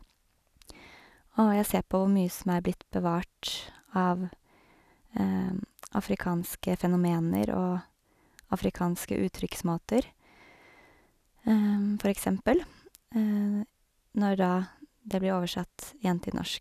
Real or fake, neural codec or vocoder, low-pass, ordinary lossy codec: real; none; 14.4 kHz; none